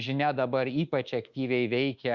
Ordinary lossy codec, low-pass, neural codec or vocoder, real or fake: Opus, 64 kbps; 7.2 kHz; codec, 24 kHz, 1.2 kbps, DualCodec; fake